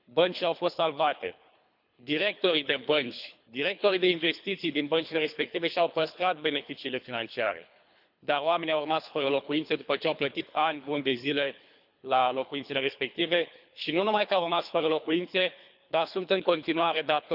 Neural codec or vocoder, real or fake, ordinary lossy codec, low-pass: codec, 24 kHz, 3 kbps, HILCodec; fake; none; 5.4 kHz